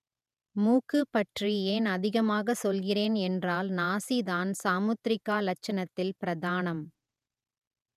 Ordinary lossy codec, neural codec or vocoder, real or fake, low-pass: none; vocoder, 48 kHz, 128 mel bands, Vocos; fake; 14.4 kHz